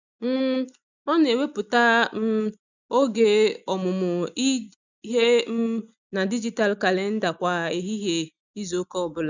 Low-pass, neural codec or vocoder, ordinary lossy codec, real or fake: 7.2 kHz; none; none; real